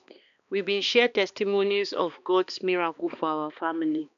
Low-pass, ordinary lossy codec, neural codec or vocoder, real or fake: 7.2 kHz; none; codec, 16 kHz, 2 kbps, X-Codec, HuBERT features, trained on balanced general audio; fake